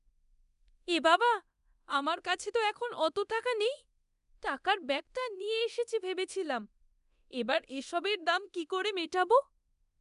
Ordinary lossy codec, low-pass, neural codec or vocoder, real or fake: none; 10.8 kHz; codec, 24 kHz, 0.9 kbps, DualCodec; fake